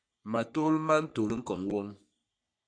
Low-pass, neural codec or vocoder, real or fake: 9.9 kHz; codec, 44.1 kHz, 3.4 kbps, Pupu-Codec; fake